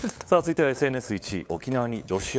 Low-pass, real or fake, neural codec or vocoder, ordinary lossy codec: none; fake; codec, 16 kHz, 8 kbps, FunCodec, trained on LibriTTS, 25 frames a second; none